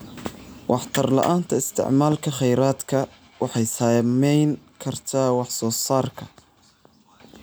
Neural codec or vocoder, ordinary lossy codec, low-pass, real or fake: none; none; none; real